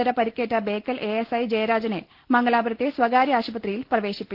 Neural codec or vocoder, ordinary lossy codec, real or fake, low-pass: none; Opus, 16 kbps; real; 5.4 kHz